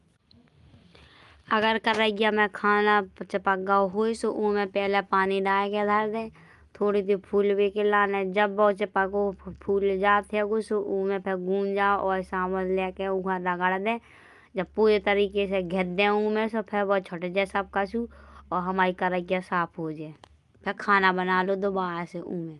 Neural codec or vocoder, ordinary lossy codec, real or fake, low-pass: none; Opus, 32 kbps; real; 10.8 kHz